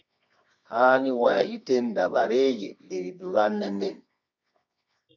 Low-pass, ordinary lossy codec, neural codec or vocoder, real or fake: 7.2 kHz; MP3, 64 kbps; codec, 24 kHz, 0.9 kbps, WavTokenizer, medium music audio release; fake